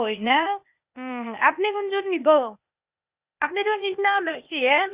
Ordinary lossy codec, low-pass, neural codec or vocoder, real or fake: Opus, 64 kbps; 3.6 kHz; codec, 16 kHz, 0.8 kbps, ZipCodec; fake